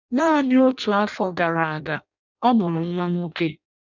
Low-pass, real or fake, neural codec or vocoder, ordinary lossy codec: 7.2 kHz; fake; codec, 16 kHz in and 24 kHz out, 0.6 kbps, FireRedTTS-2 codec; none